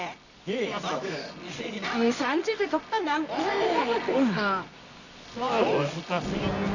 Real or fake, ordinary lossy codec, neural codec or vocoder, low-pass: fake; none; codec, 24 kHz, 0.9 kbps, WavTokenizer, medium music audio release; 7.2 kHz